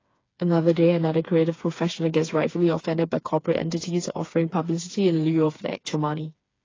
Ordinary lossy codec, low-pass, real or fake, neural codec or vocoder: AAC, 32 kbps; 7.2 kHz; fake; codec, 16 kHz, 4 kbps, FreqCodec, smaller model